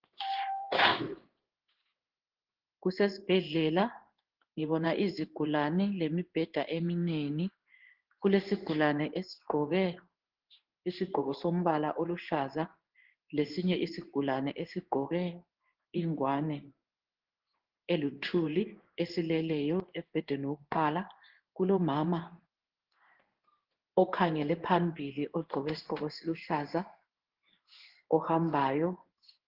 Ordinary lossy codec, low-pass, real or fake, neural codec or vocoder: Opus, 16 kbps; 5.4 kHz; fake; codec, 16 kHz in and 24 kHz out, 1 kbps, XY-Tokenizer